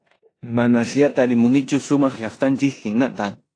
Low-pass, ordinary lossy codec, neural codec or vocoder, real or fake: 9.9 kHz; AAC, 48 kbps; codec, 16 kHz in and 24 kHz out, 0.9 kbps, LongCat-Audio-Codec, four codebook decoder; fake